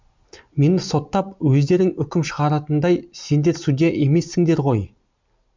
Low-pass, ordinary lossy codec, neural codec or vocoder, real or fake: 7.2 kHz; MP3, 64 kbps; none; real